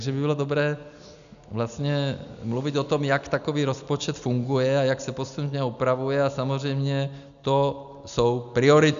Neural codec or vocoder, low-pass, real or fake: none; 7.2 kHz; real